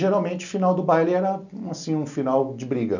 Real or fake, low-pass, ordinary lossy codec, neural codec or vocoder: real; 7.2 kHz; none; none